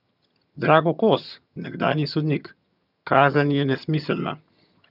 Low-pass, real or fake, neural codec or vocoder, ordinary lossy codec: 5.4 kHz; fake; vocoder, 22.05 kHz, 80 mel bands, HiFi-GAN; none